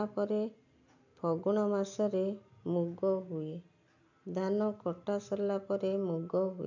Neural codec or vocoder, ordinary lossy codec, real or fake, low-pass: none; none; real; 7.2 kHz